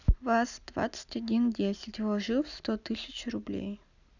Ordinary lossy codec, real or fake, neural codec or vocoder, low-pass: none; real; none; 7.2 kHz